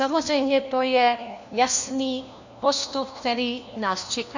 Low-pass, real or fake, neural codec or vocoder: 7.2 kHz; fake; codec, 16 kHz, 1 kbps, FunCodec, trained on LibriTTS, 50 frames a second